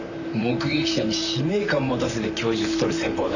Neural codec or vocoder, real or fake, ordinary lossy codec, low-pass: vocoder, 44.1 kHz, 128 mel bands, Pupu-Vocoder; fake; none; 7.2 kHz